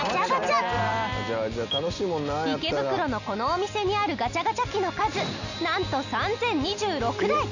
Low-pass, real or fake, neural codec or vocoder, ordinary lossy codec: 7.2 kHz; real; none; none